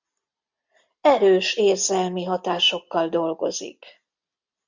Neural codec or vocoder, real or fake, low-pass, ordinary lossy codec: none; real; 7.2 kHz; MP3, 64 kbps